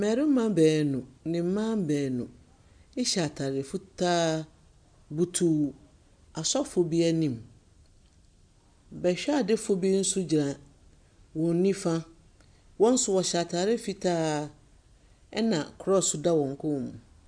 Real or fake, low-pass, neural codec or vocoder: real; 9.9 kHz; none